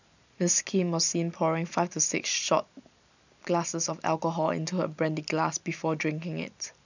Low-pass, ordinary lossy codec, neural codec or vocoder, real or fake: 7.2 kHz; none; none; real